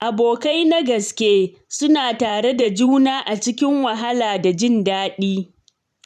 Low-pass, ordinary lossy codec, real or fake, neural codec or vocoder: 14.4 kHz; none; fake; vocoder, 44.1 kHz, 128 mel bands every 512 samples, BigVGAN v2